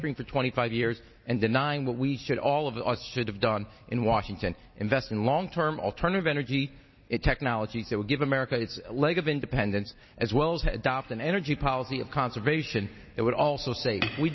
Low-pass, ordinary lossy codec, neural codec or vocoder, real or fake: 7.2 kHz; MP3, 24 kbps; none; real